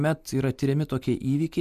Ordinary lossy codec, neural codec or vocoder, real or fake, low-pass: MP3, 96 kbps; none; real; 14.4 kHz